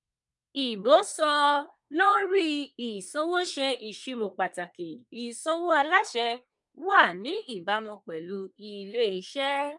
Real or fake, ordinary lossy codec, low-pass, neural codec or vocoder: fake; MP3, 96 kbps; 10.8 kHz; codec, 24 kHz, 1 kbps, SNAC